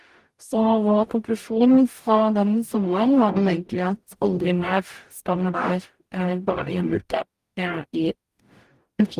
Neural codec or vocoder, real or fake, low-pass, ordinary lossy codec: codec, 44.1 kHz, 0.9 kbps, DAC; fake; 14.4 kHz; Opus, 24 kbps